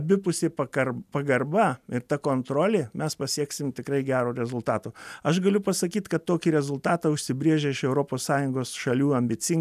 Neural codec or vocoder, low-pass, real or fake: none; 14.4 kHz; real